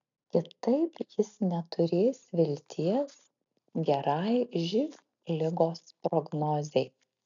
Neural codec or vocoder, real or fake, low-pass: none; real; 7.2 kHz